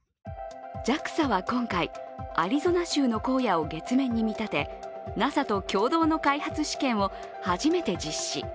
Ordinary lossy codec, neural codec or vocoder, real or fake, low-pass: none; none; real; none